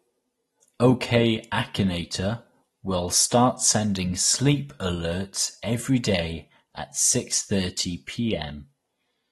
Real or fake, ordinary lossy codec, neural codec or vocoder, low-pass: real; AAC, 32 kbps; none; 19.8 kHz